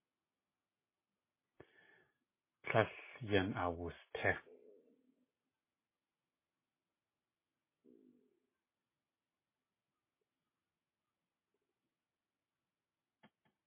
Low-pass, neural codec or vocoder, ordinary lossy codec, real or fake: 3.6 kHz; none; MP3, 16 kbps; real